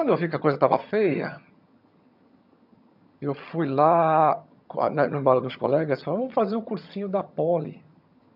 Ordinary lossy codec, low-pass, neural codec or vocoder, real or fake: none; 5.4 kHz; vocoder, 22.05 kHz, 80 mel bands, HiFi-GAN; fake